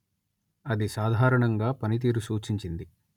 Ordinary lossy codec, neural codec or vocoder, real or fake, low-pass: none; none; real; 19.8 kHz